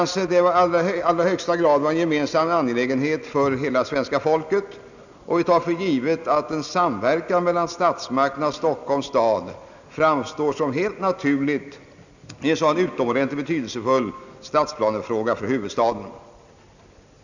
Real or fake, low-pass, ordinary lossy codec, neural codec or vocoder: real; 7.2 kHz; none; none